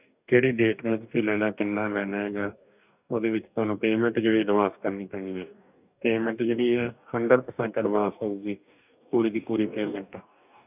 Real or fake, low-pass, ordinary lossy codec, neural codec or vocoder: fake; 3.6 kHz; none; codec, 44.1 kHz, 2.6 kbps, DAC